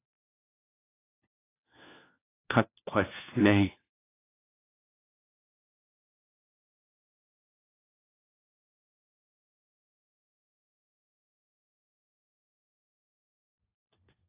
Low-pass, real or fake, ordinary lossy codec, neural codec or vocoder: 3.6 kHz; fake; AAC, 24 kbps; codec, 16 kHz, 1 kbps, FunCodec, trained on LibriTTS, 50 frames a second